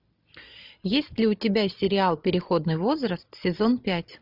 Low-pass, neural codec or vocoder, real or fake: 5.4 kHz; none; real